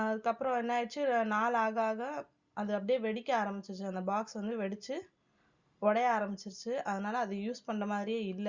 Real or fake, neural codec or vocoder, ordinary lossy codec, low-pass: real; none; Opus, 64 kbps; 7.2 kHz